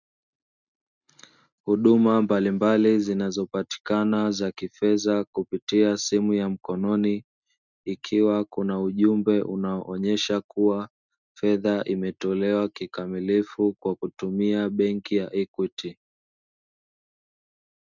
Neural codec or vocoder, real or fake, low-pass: none; real; 7.2 kHz